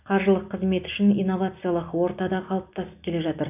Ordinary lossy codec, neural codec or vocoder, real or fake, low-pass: none; none; real; 3.6 kHz